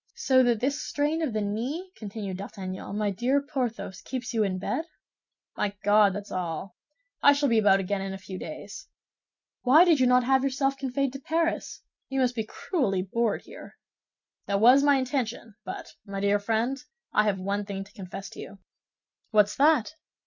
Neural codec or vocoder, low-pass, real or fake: none; 7.2 kHz; real